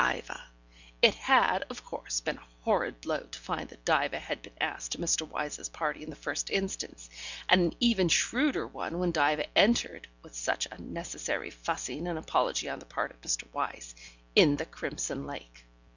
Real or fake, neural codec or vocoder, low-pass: real; none; 7.2 kHz